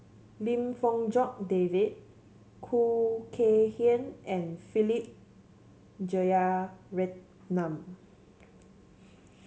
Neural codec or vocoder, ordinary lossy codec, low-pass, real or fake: none; none; none; real